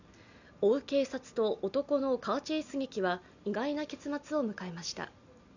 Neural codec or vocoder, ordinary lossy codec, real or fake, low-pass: none; none; real; 7.2 kHz